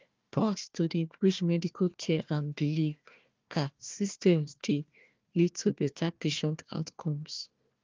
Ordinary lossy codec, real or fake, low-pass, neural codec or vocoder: Opus, 24 kbps; fake; 7.2 kHz; codec, 16 kHz, 1 kbps, FunCodec, trained on Chinese and English, 50 frames a second